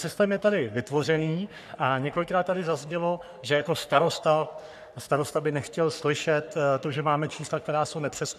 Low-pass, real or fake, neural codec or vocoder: 14.4 kHz; fake; codec, 44.1 kHz, 3.4 kbps, Pupu-Codec